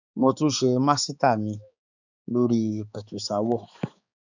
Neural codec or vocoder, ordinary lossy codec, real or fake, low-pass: codec, 16 kHz, 4 kbps, X-Codec, HuBERT features, trained on balanced general audio; none; fake; 7.2 kHz